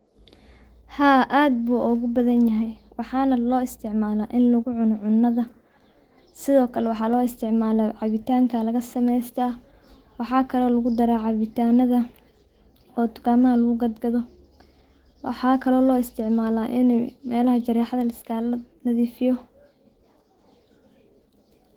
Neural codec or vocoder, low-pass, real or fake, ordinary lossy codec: autoencoder, 48 kHz, 128 numbers a frame, DAC-VAE, trained on Japanese speech; 19.8 kHz; fake; Opus, 16 kbps